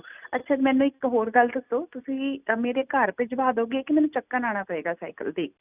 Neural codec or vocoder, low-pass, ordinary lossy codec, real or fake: none; 3.6 kHz; none; real